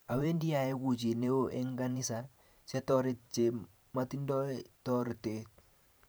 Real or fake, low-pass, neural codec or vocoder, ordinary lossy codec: fake; none; vocoder, 44.1 kHz, 128 mel bands every 512 samples, BigVGAN v2; none